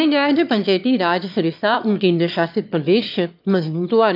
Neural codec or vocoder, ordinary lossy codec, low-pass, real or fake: autoencoder, 22.05 kHz, a latent of 192 numbers a frame, VITS, trained on one speaker; AAC, 48 kbps; 5.4 kHz; fake